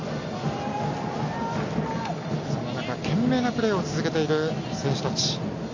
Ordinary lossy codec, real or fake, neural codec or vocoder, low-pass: AAC, 48 kbps; real; none; 7.2 kHz